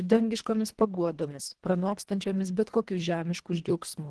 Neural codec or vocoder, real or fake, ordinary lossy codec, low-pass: codec, 24 kHz, 1.5 kbps, HILCodec; fake; Opus, 16 kbps; 10.8 kHz